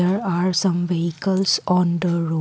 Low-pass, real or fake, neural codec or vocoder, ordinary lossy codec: none; real; none; none